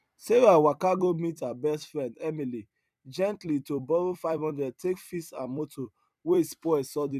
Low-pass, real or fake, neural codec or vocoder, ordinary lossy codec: 14.4 kHz; fake; vocoder, 44.1 kHz, 128 mel bands every 256 samples, BigVGAN v2; none